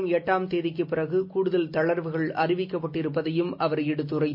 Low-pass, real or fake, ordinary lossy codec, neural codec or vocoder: 5.4 kHz; real; MP3, 32 kbps; none